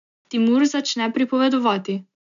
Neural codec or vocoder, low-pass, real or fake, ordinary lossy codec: none; 7.2 kHz; real; none